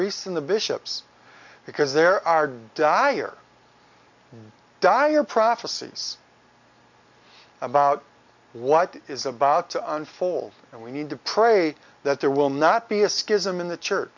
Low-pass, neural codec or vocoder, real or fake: 7.2 kHz; none; real